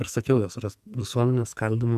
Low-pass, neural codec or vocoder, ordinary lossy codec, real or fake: 14.4 kHz; codec, 44.1 kHz, 2.6 kbps, SNAC; Opus, 64 kbps; fake